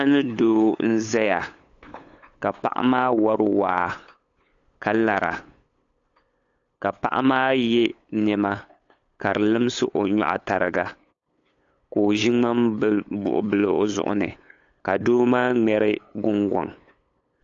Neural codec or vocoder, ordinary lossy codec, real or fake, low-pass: codec, 16 kHz, 8 kbps, FunCodec, trained on LibriTTS, 25 frames a second; AAC, 48 kbps; fake; 7.2 kHz